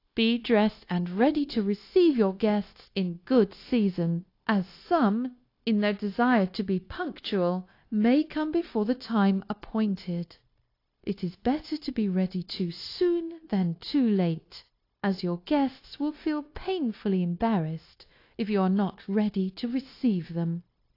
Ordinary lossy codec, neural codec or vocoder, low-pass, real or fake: AAC, 32 kbps; codec, 16 kHz, 0.9 kbps, LongCat-Audio-Codec; 5.4 kHz; fake